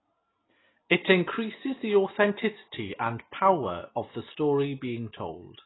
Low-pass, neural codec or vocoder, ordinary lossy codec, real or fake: 7.2 kHz; none; AAC, 16 kbps; real